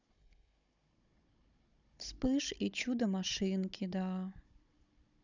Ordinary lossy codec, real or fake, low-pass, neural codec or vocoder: none; fake; 7.2 kHz; codec, 16 kHz, 16 kbps, FunCodec, trained on Chinese and English, 50 frames a second